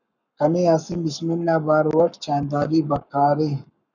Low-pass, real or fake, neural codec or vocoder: 7.2 kHz; fake; codec, 44.1 kHz, 7.8 kbps, Pupu-Codec